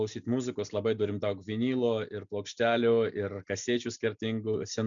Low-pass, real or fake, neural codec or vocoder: 7.2 kHz; real; none